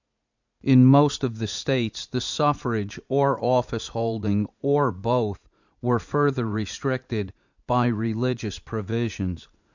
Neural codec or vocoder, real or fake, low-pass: vocoder, 44.1 kHz, 80 mel bands, Vocos; fake; 7.2 kHz